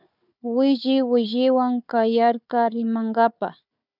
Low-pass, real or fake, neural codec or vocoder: 5.4 kHz; fake; codec, 16 kHz, 4 kbps, FunCodec, trained on Chinese and English, 50 frames a second